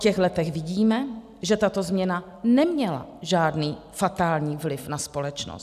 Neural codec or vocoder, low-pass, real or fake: none; 14.4 kHz; real